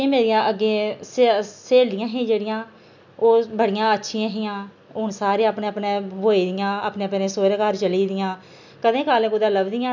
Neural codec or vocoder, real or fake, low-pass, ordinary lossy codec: none; real; 7.2 kHz; none